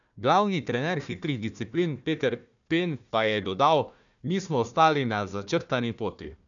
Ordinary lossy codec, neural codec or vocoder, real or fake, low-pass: none; codec, 16 kHz, 1 kbps, FunCodec, trained on Chinese and English, 50 frames a second; fake; 7.2 kHz